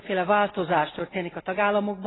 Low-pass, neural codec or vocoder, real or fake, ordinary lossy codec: 7.2 kHz; none; real; AAC, 16 kbps